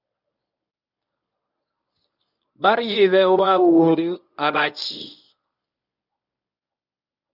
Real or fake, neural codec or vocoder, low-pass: fake; codec, 24 kHz, 0.9 kbps, WavTokenizer, medium speech release version 1; 5.4 kHz